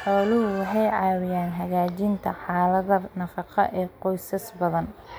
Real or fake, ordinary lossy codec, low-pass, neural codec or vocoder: real; none; none; none